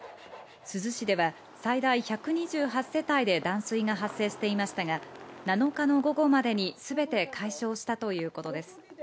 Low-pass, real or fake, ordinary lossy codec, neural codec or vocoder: none; real; none; none